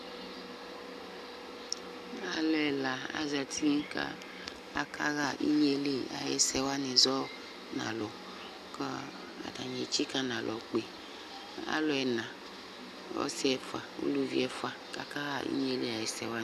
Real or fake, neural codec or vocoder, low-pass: real; none; 14.4 kHz